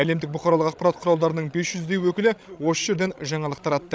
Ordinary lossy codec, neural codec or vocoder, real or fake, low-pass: none; codec, 16 kHz, 16 kbps, FreqCodec, larger model; fake; none